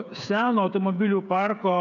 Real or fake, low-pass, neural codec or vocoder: fake; 7.2 kHz; codec, 16 kHz, 8 kbps, FreqCodec, smaller model